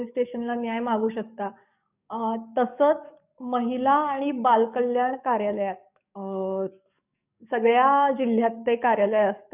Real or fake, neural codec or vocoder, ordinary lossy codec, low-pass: fake; codec, 44.1 kHz, 7.8 kbps, DAC; none; 3.6 kHz